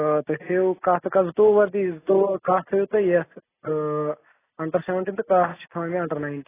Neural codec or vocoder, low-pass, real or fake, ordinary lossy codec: none; 3.6 kHz; real; AAC, 16 kbps